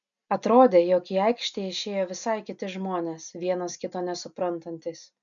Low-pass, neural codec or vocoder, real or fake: 7.2 kHz; none; real